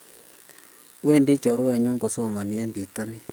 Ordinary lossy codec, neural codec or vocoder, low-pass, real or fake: none; codec, 44.1 kHz, 2.6 kbps, SNAC; none; fake